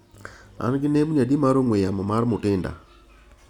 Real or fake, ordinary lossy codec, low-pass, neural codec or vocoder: real; none; 19.8 kHz; none